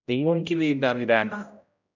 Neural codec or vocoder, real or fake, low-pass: codec, 16 kHz, 0.5 kbps, X-Codec, HuBERT features, trained on general audio; fake; 7.2 kHz